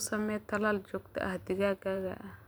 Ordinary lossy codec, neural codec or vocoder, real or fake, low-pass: none; vocoder, 44.1 kHz, 128 mel bands every 512 samples, BigVGAN v2; fake; none